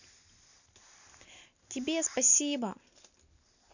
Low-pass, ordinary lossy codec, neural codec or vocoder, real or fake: 7.2 kHz; none; none; real